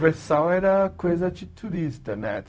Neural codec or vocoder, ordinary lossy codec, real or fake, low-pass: codec, 16 kHz, 0.4 kbps, LongCat-Audio-Codec; none; fake; none